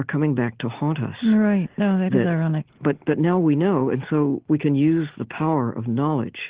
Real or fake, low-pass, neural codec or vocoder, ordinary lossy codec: real; 3.6 kHz; none; Opus, 16 kbps